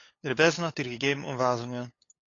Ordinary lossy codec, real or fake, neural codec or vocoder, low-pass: AAC, 32 kbps; fake; codec, 16 kHz, 8 kbps, FunCodec, trained on LibriTTS, 25 frames a second; 7.2 kHz